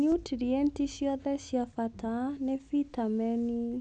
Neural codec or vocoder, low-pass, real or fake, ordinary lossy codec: none; 9.9 kHz; real; none